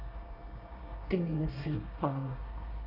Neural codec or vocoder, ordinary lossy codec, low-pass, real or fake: codec, 24 kHz, 1 kbps, SNAC; none; 5.4 kHz; fake